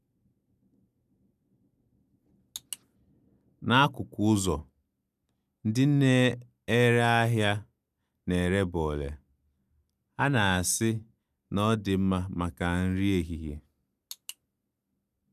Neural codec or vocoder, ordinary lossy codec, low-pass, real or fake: none; none; 14.4 kHz; real